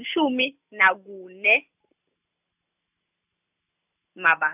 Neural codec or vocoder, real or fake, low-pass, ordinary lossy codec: none; real; 3.6 kHz; none